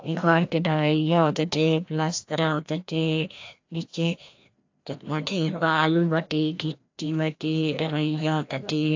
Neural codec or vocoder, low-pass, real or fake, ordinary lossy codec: codec, 16 kHz, 1 kbps, FreqCodec, larger model; 7.2 kHz; fake; AAC, 48 kbps